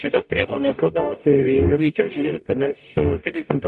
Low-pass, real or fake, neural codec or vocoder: 10.8 kHz; fake; codec, 44.1 kHz, 0.9 kbps, DAC